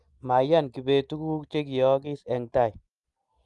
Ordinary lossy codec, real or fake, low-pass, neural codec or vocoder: Opus, 32 kbps; real; 10.8 kHz; none